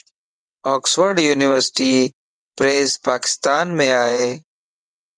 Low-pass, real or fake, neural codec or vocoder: 9.9 kHz; fake; vocoder, 22.05 kHz, 80 mel bands, WaveNeXt